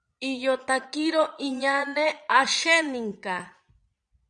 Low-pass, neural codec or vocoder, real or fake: 9.9 kHz; vocoder, 22.05 kHz, 80 mel bands, Vocos; fake